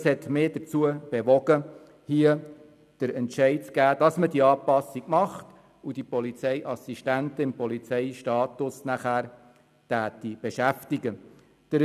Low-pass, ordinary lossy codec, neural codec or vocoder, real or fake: 14.4 kHz; none; none; real